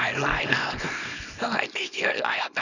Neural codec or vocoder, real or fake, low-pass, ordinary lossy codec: codec, 24 kHz, 0.9 kbps, WavTokenizer, small release; fake; 7.2 kHz; none